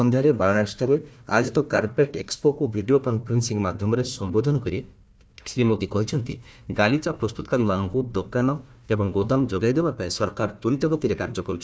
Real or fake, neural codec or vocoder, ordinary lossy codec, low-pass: fake; codec, 16 kHz, 1 kbps, FunCodec, trained on Chinese and English, 50 frames a second; none; none